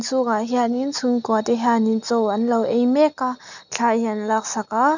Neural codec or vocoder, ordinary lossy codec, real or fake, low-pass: none; none; real; 7.2 kHz